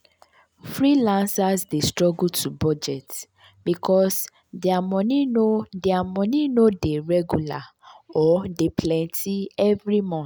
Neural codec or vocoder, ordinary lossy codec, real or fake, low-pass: none; none; real; none